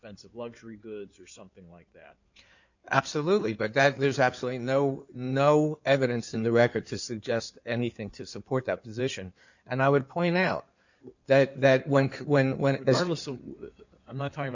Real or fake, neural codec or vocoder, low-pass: fake; codec, 16 kHz in and 24 kHz out, 2.2 kbps, FireRedTTS-2 codec; 7.2 kHz